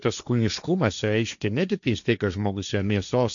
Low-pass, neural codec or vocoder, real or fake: 7.2 kHz; codec, 16 kHz, 1.1 kbps, Voila-Tokenizer; fake